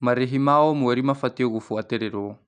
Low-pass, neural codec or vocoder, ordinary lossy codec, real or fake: 9.9 kHz; none; none; real